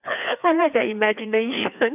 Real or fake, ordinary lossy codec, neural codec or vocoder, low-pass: fake; none; codec, 16 kHz, 2 kbps, FreqCodec, larger model; 3.6 kHz